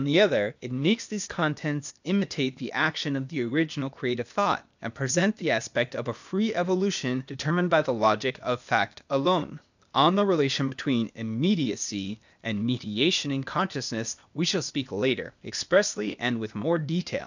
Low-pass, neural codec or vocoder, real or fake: 7.2 kHz; codec, 16 kHz, 0.8 kbps, ZipCodec; fake